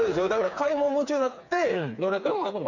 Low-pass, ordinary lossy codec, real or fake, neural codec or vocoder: 7.2 kHz; none; fake; codec, 16 kHz, 4 kbps, FreqCodec, smaller model